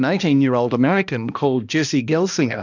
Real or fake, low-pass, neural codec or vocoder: fake; 7.2 kHz; codec, 16 kHz, 1 kbps, X-Codec, HuBERT features, trained on balanced general audio